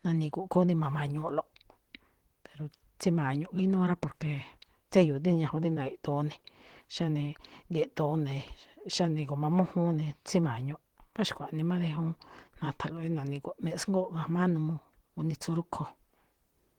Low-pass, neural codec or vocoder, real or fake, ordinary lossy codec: 19.8 kHz; vocoder, 44.1 kHz, 128 mel bands, Pupu-Vocoder; fake; Opus, 16 kbps